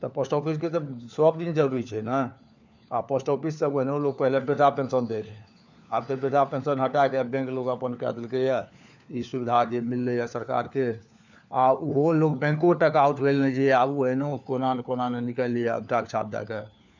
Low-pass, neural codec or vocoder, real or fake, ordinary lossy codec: 7.2 kHz; codec, 16 kHz, 4 kbps, FunCodec, trained on LibriTTS, 50 frames a second; fake; none